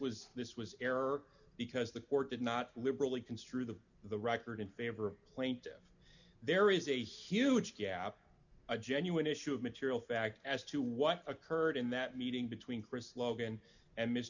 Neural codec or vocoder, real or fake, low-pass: none; real; 7.2 kHz